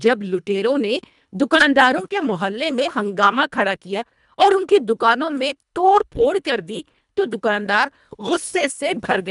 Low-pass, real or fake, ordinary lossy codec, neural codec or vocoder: 10.8 kHz; fake; none; codec, 24 kHz, 1.5 kbps, HILCodec